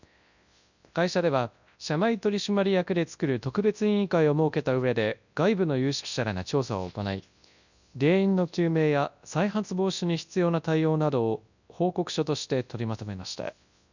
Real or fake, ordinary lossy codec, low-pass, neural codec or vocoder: fake; none; 7.2 kHz; codec, 24 kHz, 0.9 kbps, WavTokenizer, large speech release